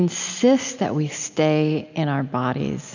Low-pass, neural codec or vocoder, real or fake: 7.2 kHz; none; real